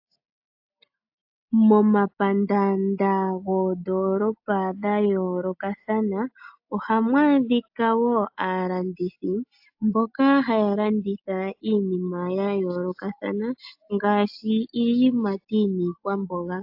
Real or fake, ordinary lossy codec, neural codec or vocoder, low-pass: real; AAC, 48 kbps; none; 5.4 kHz